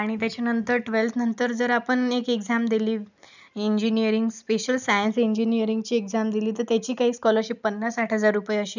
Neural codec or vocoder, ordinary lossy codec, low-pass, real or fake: none; none; 7.2 kHz; real